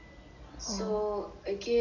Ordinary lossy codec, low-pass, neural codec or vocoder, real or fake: none; 7.2 kHz; none; real